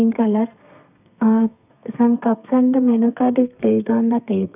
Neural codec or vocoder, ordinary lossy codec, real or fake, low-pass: codec, 32 kHz, 1.9 kbps, SNAC; none; fake; 3.6 kHz